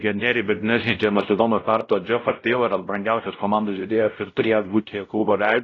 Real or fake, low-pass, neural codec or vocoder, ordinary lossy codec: fake; 7.2 kHz; codec, 16 kHz, 0.5 kbps, X-Codec, WavLM features, trained on Multilingual LibriSpeech; AAC, 32 kbps